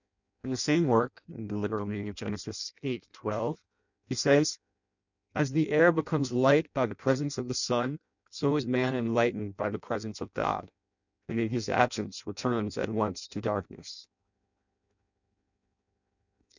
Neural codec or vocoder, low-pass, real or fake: codec, 16 kHz in and 24 kHz out, 0.6 kbps, FireRedTTS-2 codec; 7.2 kHz; fake